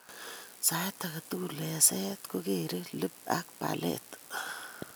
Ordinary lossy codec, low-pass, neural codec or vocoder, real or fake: none; none; none; real